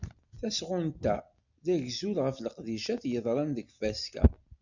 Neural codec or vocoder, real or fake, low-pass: none; real; 7.2 kHz